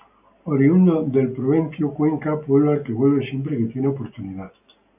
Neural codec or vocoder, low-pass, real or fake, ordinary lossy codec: none; 3.6 kHz; real; AAC, 32 kbps